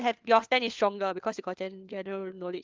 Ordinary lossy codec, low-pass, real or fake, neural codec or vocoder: Opus, 32 kbps; 7.2 kHz; fake; codec, 16 kHz, 4 kbps, FunCodec, trained on LibriTTS, 50 frames a second